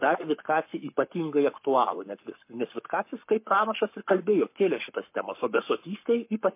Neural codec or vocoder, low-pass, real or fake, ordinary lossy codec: codec, 44.1 kHz, 7.8 kbps, Pupu-Codec; 3.6 kHz; fake; MP3, 24 kbps